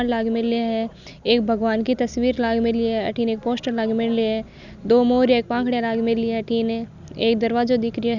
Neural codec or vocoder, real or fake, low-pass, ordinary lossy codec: none; real; 7.2 kHz; none